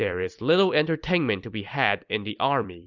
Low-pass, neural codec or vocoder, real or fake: 7.2 kHz; vocoder, 44.1 kHz, 128 mel bands every 256 samples, BigVGAN v2; fake